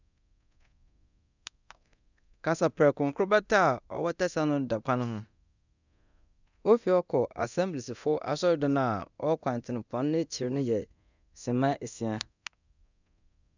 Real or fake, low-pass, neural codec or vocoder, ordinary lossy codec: fake; 7.2 kHz; codec, 24 kHz, 0.9 kbps, DualCodec; none